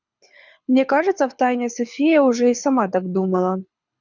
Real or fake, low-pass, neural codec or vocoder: fake; 7.2 kHz; codec, 24 kHz, 6 kbps, HILCodec